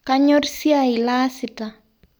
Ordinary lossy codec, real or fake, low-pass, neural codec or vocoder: none; real; none; none